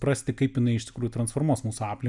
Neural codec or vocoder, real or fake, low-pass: none; real; 10.8 kHz